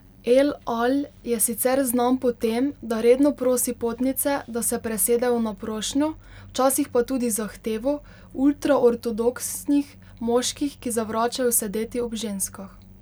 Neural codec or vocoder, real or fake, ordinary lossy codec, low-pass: none; real; none; none